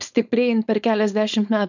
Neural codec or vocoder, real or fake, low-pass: codec, 16 kHz, 4.8 kbps, FACodec; fake; 7.2 kHz